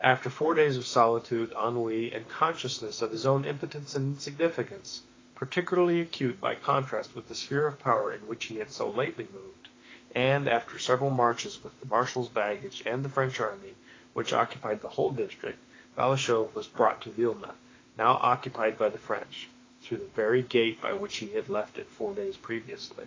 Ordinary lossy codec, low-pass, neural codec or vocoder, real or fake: AAC, 32 kbps; 7.2 kHz; autoencoder, 48 kHz, 32 numbers a frame, DAC-VAE, trained on Japanese speech; fake